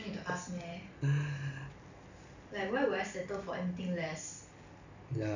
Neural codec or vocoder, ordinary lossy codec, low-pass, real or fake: none; none; 7.2 kHz; real